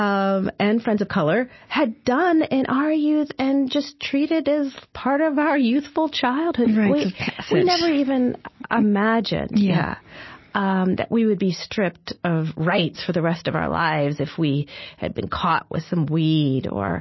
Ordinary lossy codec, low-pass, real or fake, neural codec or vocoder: MP3, 24 kbps; 7.2 kHz; real; none